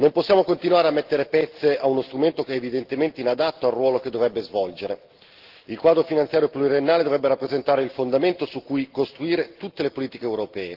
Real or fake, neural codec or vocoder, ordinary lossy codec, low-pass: real; none; Opus, 16 kbps; 5.4 kHz